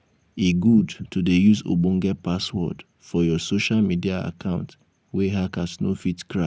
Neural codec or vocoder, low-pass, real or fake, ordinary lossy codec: none; none; real; none